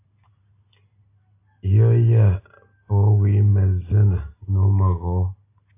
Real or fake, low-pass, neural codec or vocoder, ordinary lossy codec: real; 3.6 kHz; none; AAC, 24 kbps